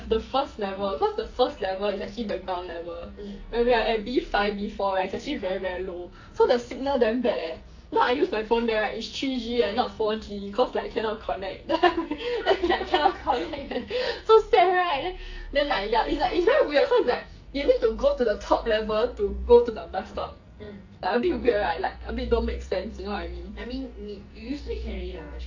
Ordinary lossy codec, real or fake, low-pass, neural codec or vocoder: AAC, 48 kbps; fake; 7.2 kHz; codec, 44.1 kHz, 2.6 kbps, SNAC